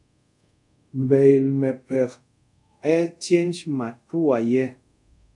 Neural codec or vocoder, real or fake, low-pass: codec, 24 kHz, 0.5 kbps, DualCodec; fake; 10.8 kHz